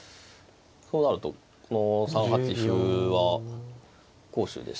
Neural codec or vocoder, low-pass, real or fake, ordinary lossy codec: none; none; real; none